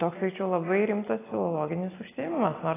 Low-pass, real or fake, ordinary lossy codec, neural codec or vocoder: 3.6 kHz; real; AAC, 16 kbps; none